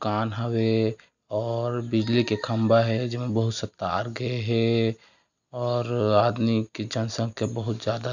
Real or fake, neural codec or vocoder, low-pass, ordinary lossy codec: real; none; 7.2 kHz; none